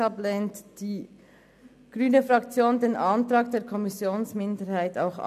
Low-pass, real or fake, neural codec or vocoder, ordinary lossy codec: 14.4 kHz; real; none; none